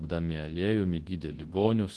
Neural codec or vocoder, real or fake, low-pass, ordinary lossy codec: codec, 24 kHz, 0.9 kbps, WavTokenizer, large speech release; fake; 10.8 kHz; Opus, 16 kbps